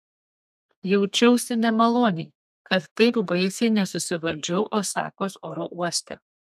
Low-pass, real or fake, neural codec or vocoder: 14.4 kHz; fake; codec, 32 kHz, 1.9 kbps, SNAC